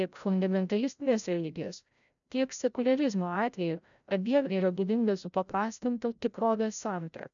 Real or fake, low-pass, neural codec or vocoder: fake; 7.2 kHz; codec, 16 kHz, 0.5 kbps, FreqCodec, larger model